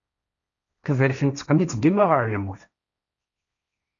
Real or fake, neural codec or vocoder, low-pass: fake; codec, 16 kHz, 1.1 kbps, Voila-Tokenizer; 7.2 kHz